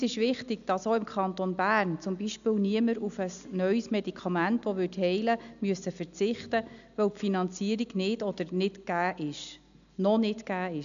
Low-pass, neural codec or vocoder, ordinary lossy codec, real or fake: 7.2 kHz; none; none; real